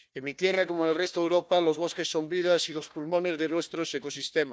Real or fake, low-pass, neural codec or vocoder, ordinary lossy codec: fake; none; codec, 16 kHz, 1 kbps, FunCodec, trained on Chinese and English, 50 frames a second; none